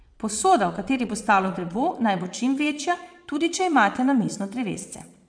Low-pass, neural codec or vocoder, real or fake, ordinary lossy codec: 9.9 kHz; vocoder, 22.05 kHz, 80 mel bands, Vocos; fake; none